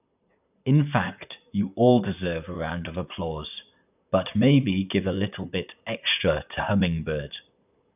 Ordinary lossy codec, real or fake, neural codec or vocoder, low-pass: none; fake; vocoder, 44.1 kHz, 128 mel bands, Pupu-Vocoder; 3.6 kHz